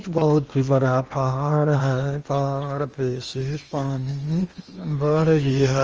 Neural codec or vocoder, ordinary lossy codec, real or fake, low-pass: codec, 16 kHz in and 24 kHz out, 0.8 kbps, FocalCodec, streaming, 65536 codes; Opus, 24 kbps; fake; 7.2 kHz